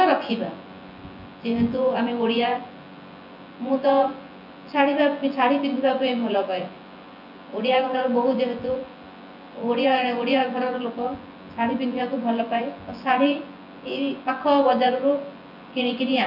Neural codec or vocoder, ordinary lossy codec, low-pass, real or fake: vocoder, 24 kHz, 100 mel bands, Vocos; none; 5.4 kHz; fake